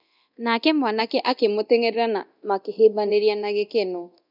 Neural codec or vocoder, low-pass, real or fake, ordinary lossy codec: codec, 24 kHz, 0.9 kbps, DualCodec; 5.4 kHz; fake; none